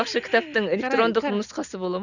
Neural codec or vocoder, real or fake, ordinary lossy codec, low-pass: none; real; none; 7.2 kHz